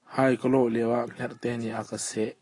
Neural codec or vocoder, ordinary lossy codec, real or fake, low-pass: none; AAC, 32 kbps; real; 10.8 kHz